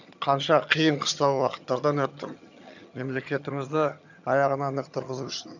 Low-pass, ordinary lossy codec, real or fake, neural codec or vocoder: 7.2 kHz; none; fake; vocoder, 22.05 kHz, 80 mel bands, HiFi-GAN